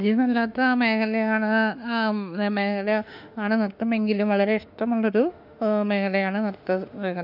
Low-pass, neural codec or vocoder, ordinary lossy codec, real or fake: 5.4 kHz; autoencoder, 48 kHz, 32 numbers a frame, DAC-VAE, trained on Japanese speech; none; fake